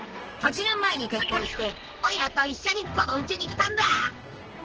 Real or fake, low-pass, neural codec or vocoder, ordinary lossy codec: fake; 7.2 kHz; codec, 32 kHz, 1.9 kbps, SNAC; Opus, 16 kbps